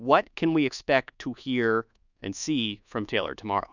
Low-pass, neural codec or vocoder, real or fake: 7.2 kHz; codec, 24 kHz, 1.2 kbps, DualCodec; fake